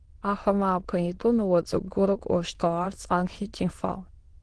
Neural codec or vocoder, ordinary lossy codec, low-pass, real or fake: autoencoder, 22.05 kHz, a latent of 192 numbers a frame, VITS, trained on many speakers; Opus, 16 kbps; 9.9 kHz; fake